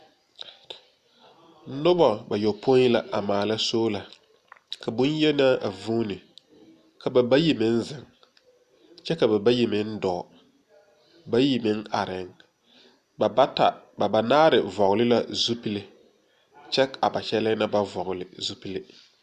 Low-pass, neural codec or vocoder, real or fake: 14.4 kHz; none; real